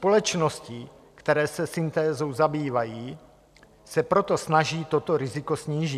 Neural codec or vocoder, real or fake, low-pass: none; real; 14.4 kHz